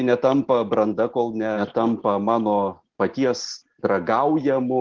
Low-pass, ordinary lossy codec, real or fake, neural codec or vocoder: 7.2 kHz; Opus, 16 kbps; real; none